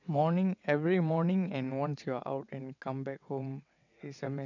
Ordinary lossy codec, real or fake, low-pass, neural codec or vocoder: none; fake; 7.2 kHz; vocoder, 22.05 kHz, 80 mel bands, WaveNeXt